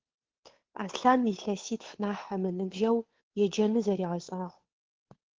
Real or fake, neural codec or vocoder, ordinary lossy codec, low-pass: fake; codec, 16 kHz, 2 kbps, FunCodec, trained on Chinese and English, 25 frames a second; Opus, 16 kbps; 7.2 kHz